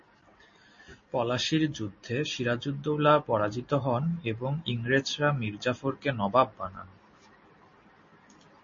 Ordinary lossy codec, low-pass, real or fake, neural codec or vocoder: MP3, 32 kbps; 7.2 kHz; real; none